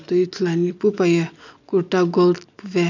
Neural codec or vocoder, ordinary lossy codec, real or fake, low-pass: none; none; real; 7.2 kHz